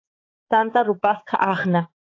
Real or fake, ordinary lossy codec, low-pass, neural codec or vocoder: fake; AAC, 32 kbps; 7.2 kHz; codec, 16 kHz, 4 kbps, X-Codec, HuBERT features, trained on balanced general audio